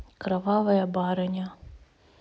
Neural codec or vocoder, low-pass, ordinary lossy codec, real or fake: none; none; none; real